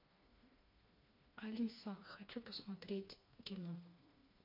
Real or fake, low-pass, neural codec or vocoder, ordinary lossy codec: fake; 5.4 kHz; codec, 16 kHz, 2 kbps, FreqCodec, smaller model; MP3, 32 kbps